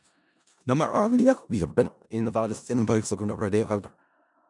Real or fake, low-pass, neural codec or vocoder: fake; 10.8 kHz; codec, 16 kHz in and 24 kHz out, 0.4 kbps, LongCat-Audio-Codec, four codebook decoder